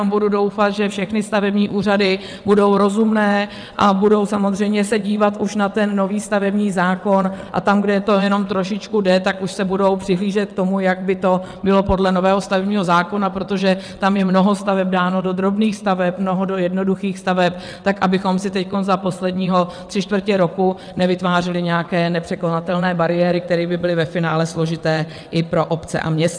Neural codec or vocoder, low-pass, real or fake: vocoder, 22.05 kHz, 80 mel bands, WaveNeXt; 9.9 kHz; fake